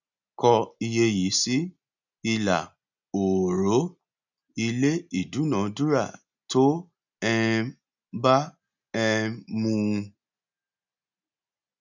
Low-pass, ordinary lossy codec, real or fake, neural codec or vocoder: 7.2 kHz; none; real; none